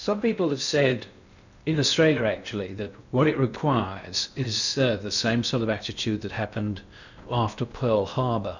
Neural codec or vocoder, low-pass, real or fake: codec, 16 kHz in and 24 kHz out, 0.6 kbps, FocalCodec, streaming, 2048 codes; 7.2 kHz; fake